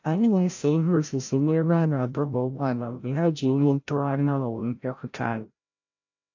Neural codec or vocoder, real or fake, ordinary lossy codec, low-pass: codec, 16 kHz, 0.5 kbps, FreqCodec, larger model; fake; AAC, 48 kbps; 7.2 kHz